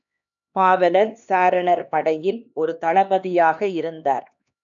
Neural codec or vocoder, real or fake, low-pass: codec, 16 kHz, 2 kbps, X-Codec, HuBERT features, trained on LibriSpeech; fake; 7.2 kHz